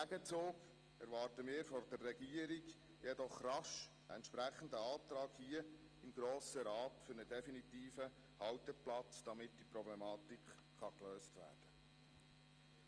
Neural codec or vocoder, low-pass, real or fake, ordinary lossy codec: none; 9.9 kHz; real; Opus, 24 kbps